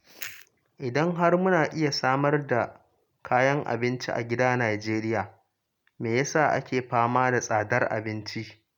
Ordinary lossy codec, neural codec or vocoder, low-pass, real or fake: none; none; 19.8 kHz; real